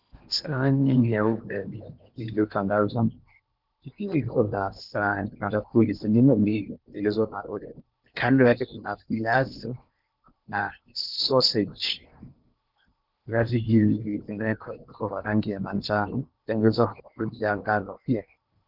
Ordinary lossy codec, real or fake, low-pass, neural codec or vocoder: Opus, 24 kbps; fake; 5.4 kHz; codec, 16 kHz in and 24 kHz out, 0.8 kbps, FocalCodec, streaming, 65536 codes